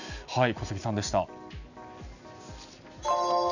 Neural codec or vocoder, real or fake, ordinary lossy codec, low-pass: none; real; none; 7.2 kHz